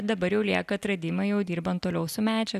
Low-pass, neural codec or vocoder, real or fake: 14.4 kHz; none; real